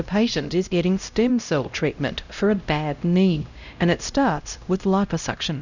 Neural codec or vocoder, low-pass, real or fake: codec, 16 kHz, 0.5 kbps, X-Codec, HuBERT features, trained on LibriSpeech; 7.2 kHz; fake